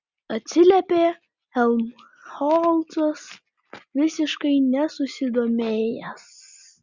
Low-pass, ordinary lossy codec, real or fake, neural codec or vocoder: 7.2 kHz; Opus, 64 kbps; real; none